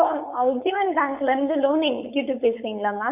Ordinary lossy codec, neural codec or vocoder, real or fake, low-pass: none; codec, 16 kHz, 4.8 kbps, FACodec; fake; 3.6 kHz